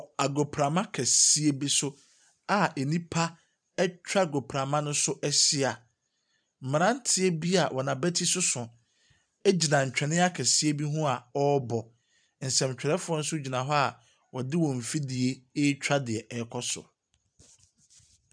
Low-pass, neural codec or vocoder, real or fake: 9.9 kHz; vocoder, 44.1 kHz, 128 mel bands every 256 samples, BigVGAN v2; fake